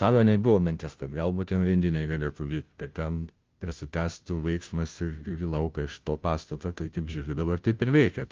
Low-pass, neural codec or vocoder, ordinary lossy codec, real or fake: 7.2 kHz; codec, 16 kHz, 0.5 kbps, FunCodec, trained on Chinese and English, 25 frames a second; Opus, 24 kbps; fake